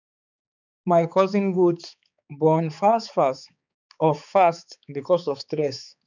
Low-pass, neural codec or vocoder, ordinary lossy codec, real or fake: 7.2 kHz; codec, 16 kHz, 4 kbps, X-Codec, HuBERT features, trained on balanced general audio; none; fake